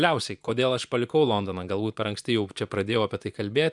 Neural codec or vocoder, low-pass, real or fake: vocoder, 24 kHz, 100 mel bands, Vocos; 10.8 kHz; fake